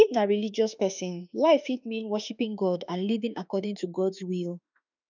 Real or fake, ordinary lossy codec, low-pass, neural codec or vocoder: fake; none; 7.2 kHz; autoencoder, 48 kHz, 32 numbers a frame, DAC-VAE, trained on Japanese speech